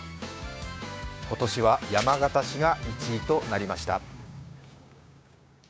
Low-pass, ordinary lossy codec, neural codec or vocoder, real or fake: none; none; codec, 16 kHz, 6 kbps, DAC; fake